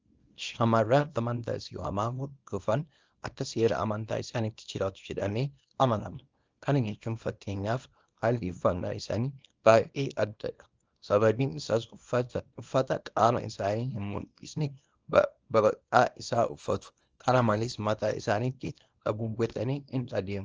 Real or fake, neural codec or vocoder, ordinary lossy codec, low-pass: fake; codec, 24 kHz, 0.9 kbps, WavTokenizer, small release; Opus, 24 kbps; 7.2 kHz